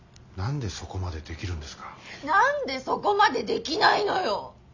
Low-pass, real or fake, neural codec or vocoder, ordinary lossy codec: 7.2 kHz; real; none; none